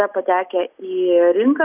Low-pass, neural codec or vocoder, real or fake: 3.6 kHz; none; real